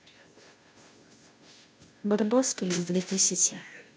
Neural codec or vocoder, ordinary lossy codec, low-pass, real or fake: codec, 16 kHz, 0.5 kbps, FunCodec, trained on Chinese and English, 25 frames a second; none; none; fake